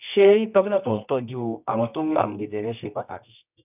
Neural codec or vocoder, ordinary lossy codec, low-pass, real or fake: codec, 24 kHz, 0.9 kbps, WavTokenizer, medium music audio release; none; 3.6 kHz; fake